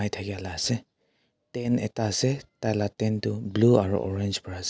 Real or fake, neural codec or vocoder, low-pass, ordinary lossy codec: real; none; none; none